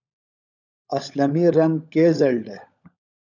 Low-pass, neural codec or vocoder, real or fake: 7.2 kHz; codec, 16 kHz, 16 kbps, FunCodec, trained on LibriTTS, 50 frames a second; fake